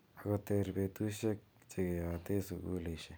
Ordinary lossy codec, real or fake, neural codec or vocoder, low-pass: none; fake; vocoder, 44.1 kHz, 128 mel bands every 256 samples, BigVGAN v2; none